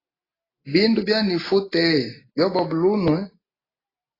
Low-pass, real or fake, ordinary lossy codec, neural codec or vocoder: 5.4 kHz; real; AAC, 24 kbps; none